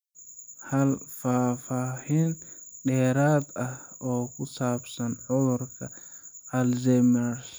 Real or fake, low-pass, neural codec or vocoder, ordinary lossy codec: real; none; none; none